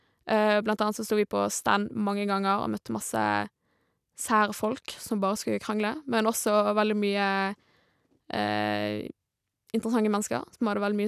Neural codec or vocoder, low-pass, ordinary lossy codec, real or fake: none; 14.4 kHz; none; real